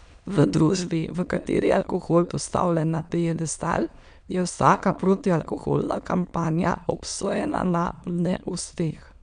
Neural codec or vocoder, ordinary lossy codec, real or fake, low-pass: autoencoder, 22.05 kHz, a latent of 192 numbers a frame, VITS, trained on many speakers; none; fake; 9.9 kHz